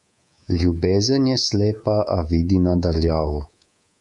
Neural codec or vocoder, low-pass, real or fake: codec, 24 kHz, 3.1 kbps, DualCodec; 10.8 kHz; fake